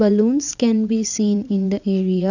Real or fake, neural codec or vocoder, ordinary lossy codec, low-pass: fake; vocoder, 22.05 kHz, 80 mel bands, Vocos; none; 7.2 kHz